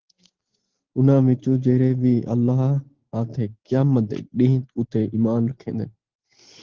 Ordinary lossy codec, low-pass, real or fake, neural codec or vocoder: Opus, 16 kbps; 7.2 kHz; real; none